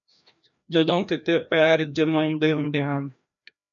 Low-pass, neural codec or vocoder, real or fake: 7.2 kHz; codec, 16 kHz, 1 kbps, FreqCodec, larger model; fake